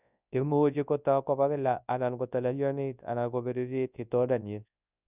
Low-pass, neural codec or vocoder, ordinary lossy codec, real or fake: 3.6 kHz; codec, 24 kHz, 0.9 kbps, WavTokenizer, large speech release; none; fake